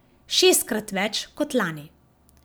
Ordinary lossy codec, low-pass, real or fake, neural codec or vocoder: none; none; real; none